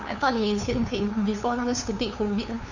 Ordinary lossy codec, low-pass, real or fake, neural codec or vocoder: none; 7.2 kHz; fake; codec, 16 kHz, 2 kbps, FunCodec, trained on LibriTTS, 25 frames a second